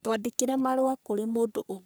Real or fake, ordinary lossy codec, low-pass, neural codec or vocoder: fake; none; none; codec, 44.1 kHz, 3.4 kbps, Pupu-Codec